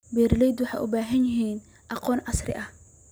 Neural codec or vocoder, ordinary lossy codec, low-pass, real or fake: none; none; none; real